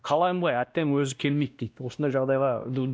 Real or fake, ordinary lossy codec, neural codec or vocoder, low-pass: fake; none; codec, 16 kHz, 1 kbps, X-Codec, WavLM features, trained on Multilingual LibriSpeech; none